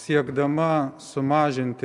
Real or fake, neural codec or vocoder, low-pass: real; none; 10.8 kHz